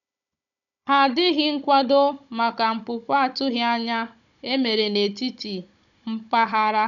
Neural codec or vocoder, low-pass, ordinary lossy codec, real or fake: codec, 16 kHz, 16 kbps, FunCodec, trained on Chinese and English, 50 frames a second; 7.2 kHz; none; fake